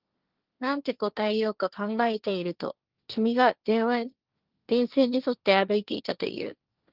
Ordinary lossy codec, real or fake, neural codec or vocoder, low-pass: Opus, 32 kbps; fake; codec, 16 kHz, 1.1 kbps, Voila-Tokenizer; 5.4 kHz